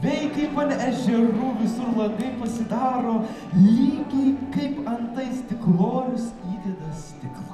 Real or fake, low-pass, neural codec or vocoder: fake; 14.4 kHz; vocoder, 44.1 kHz, 128 mel bands every 256 samples, BigVGAN v2